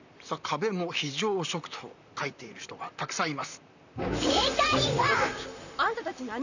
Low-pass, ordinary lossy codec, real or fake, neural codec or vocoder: 7.2 kHz; none; fake; vocoder, 44.1 kHz, 128 mel bands, Pupu-Vocoder